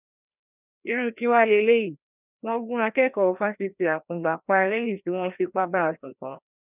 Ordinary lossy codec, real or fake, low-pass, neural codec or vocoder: none; fake; 3.6 kHz; codec, 16 kHz, 1 kbps, FreqCodec, larger model